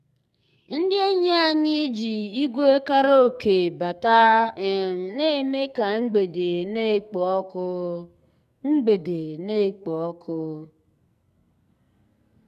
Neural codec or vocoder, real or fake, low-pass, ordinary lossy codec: codec, 44.1 kHz, 2.6 kbps, SNAC; fake; 14.4 kHz; none